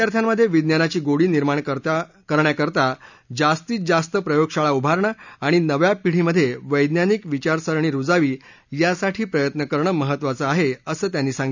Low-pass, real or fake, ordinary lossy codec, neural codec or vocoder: 7.2 kHz; real; none; none